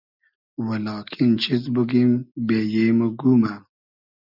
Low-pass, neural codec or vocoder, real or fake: 5.4 kHz; none; real